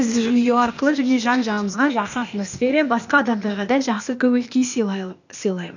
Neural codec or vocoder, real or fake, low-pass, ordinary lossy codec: codec, 16 kHz, 0.8 kbps, ZipCodec; fake; 7.2 kHz; none